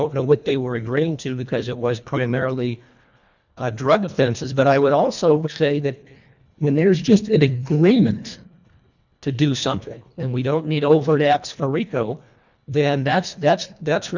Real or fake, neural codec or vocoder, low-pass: fake; codec, 24 kHz, 1.5 kbps, HILCodec; 7.2 kHz